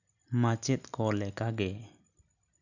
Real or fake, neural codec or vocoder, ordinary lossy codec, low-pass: real; none; none; 7.2 kHz